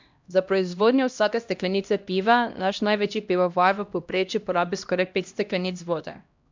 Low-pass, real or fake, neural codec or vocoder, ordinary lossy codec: 7.2 kHz; fake; codec, 16 kHz, 1 kbps, X-Codec, HuBERT features, trained on LibriSpeech; MP3, 64 kbps